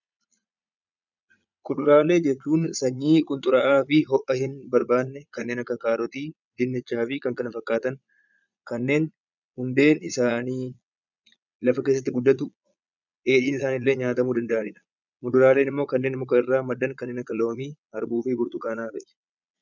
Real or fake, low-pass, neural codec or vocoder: fake; 7.2 kHz; vocoder, 22.05 kHz, 80 mel bands, Vocos